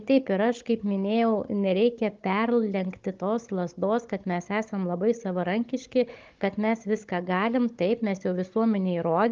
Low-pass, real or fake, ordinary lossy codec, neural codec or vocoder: 7.2 kHz; fake; Opus, 32 kbps; codec, 16 kHz, 16 kbps, FunCodec, trained on Chinese and English, 50 frames a second